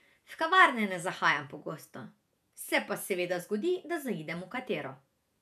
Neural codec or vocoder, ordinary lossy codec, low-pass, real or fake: vocoder, 48 kHz, 128 mel bands, Vocos; none; 14.4 kHz; fake